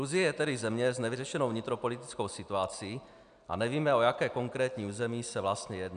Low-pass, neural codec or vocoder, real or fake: 9.9 kHz; none; real